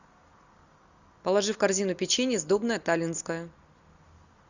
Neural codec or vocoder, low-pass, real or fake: none; 7.2 kHz; real